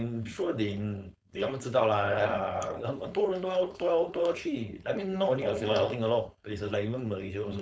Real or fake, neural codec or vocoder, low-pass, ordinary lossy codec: fake; codec, 16 kHz, 4.8 kbps, FACodec; none; none